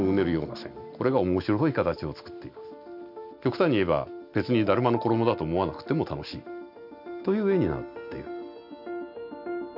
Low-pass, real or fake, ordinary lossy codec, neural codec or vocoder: 5.4 kHz; real; none; none